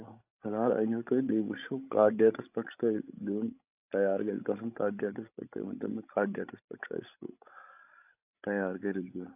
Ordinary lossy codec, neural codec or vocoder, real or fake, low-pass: AAC, 32 kbps; codec, 16 kHz, 16 kbps, FreqCodec, larger model; fake; 3.6 kHz